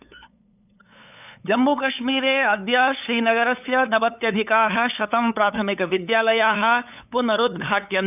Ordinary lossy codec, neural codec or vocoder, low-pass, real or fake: none; codec, 16 kHz, 8 kbps, FunCodec, trained on LibriTTS, 25 frames a second; 3.6 kHz; fake